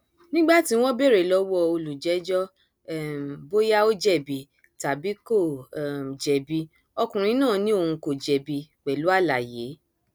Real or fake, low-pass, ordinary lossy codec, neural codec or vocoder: real; none; none; none